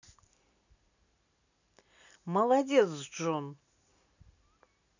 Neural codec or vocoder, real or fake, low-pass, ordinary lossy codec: none; real; 7.2 kHz; none